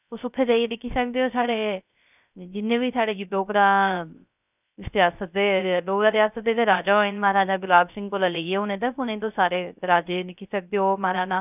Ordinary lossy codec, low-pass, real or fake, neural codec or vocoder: none; 3.6 kHz; fake; codec, 16 kHz, 0.3 kbps, FocalCodec